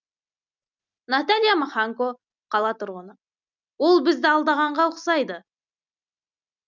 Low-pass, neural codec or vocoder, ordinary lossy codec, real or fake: 7.2 kHz; none; none; real